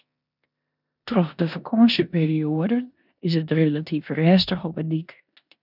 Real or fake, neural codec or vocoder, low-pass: fake; codec, 16 kHz in and 24 kHz out, 0.9 kbps, LongCat-Audio-Codec, four codebook decoder; 5.4 kHz